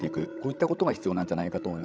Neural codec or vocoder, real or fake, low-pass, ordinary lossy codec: codec, 16 kHz, 16 kbps, FreqCodec, larger model; fake; none; none